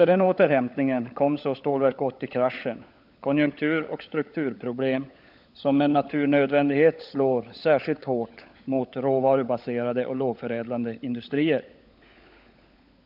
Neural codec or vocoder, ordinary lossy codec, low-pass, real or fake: codec, 16 kHz, 16 kbps, FunCodec, trained on LibriTTS, 50 frames a second; none; 5.4 kHz; fake